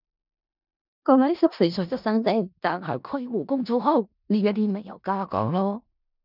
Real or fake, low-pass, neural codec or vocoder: fake; 5.4 kHz; codec, 16 kHz in and 24 kHz out, 0.4 kbps, LongCat-Audio-Codec, four codebook decoder